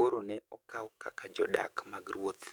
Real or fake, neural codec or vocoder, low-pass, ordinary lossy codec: fake; codec, 44.1 kHz, 7.8 kbps, DAC; 19.8 kHz; none